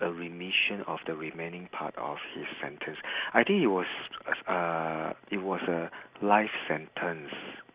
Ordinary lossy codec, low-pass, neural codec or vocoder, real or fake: Opus, 64 kbps; 3.6 kHz; none; real